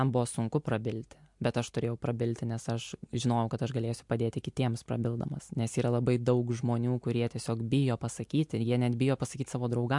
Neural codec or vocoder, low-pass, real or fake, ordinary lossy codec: none; 10.8 kHz; real; MP3, 64 kbps